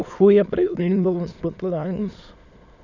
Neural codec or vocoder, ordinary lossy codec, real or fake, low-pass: autoencoder, 22.05 kHz, a latent of 192 numbers a frame, VITS, trained on many speakers; none; fake; 7.2 kHz